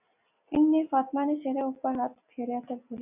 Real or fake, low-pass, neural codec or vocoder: real; 3.6 kHz; none